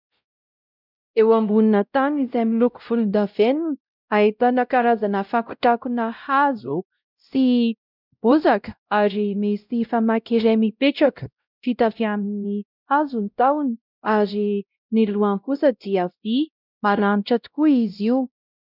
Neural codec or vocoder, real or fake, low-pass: codec, 16 kHz, 0.5 kbps, X-Codec, WavLM features, trained on Multilingual LibriSpeech; fake; 5.4 kHz